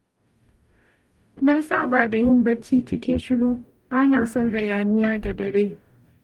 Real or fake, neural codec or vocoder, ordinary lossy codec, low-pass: fake; codec, 44.1 kHz, 0.9 kbps, DAC; Opus, 32 kbps; 19.8 kHz